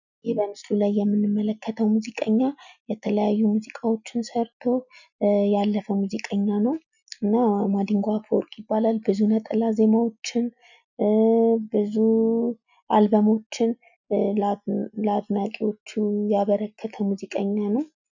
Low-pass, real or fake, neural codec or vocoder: 7.2 kHz; real; none